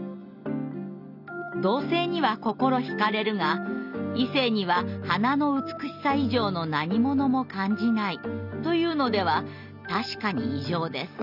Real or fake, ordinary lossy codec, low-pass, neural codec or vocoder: real; none; 5.4 kHz; none